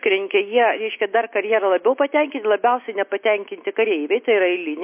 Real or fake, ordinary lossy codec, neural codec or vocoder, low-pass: real; MP3, 24 kbps; none; 3.6 kHz